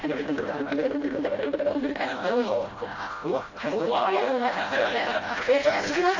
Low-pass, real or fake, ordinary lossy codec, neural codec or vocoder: 7.2 kHz; fake; AAC, 32 kbps; codec, 16 kHz, 0.5 kbps, FreqCodec, smaller model